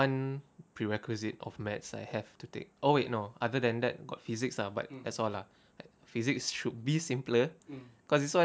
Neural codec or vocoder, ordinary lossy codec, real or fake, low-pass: none; none; real; none